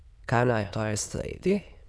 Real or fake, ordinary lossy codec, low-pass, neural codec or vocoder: fake; none; none; autoencoder, 22.05 kHz, a latent of 192 numbers a frame, VITS, trained on many speakers